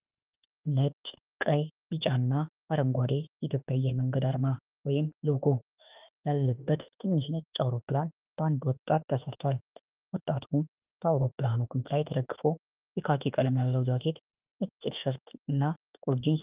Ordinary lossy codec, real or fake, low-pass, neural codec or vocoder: Opus, 24 kbps; fake; 3.6 kHz; autoencoder, 48 kHz, 32 numbers a frame, DAC-VAE, trained on Japanese speech